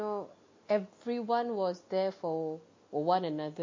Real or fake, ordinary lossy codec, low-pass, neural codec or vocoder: real; MP3, 32 kbps; 7.2 kHz; none